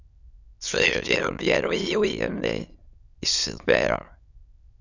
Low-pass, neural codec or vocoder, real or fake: 7.2 kHz; autoencoder, 22.05 kHz, a latent of 192 numbers a frame, VITS, trained on many speakers; fake